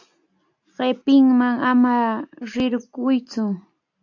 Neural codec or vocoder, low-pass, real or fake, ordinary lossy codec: none; 7.2 kHz; real; AAC, 48 kbps